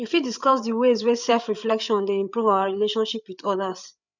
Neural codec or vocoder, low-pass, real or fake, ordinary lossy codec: codec, 16 kHz, 8 kbps, FreqCodec, larger model; 7.2 kHz; fake; none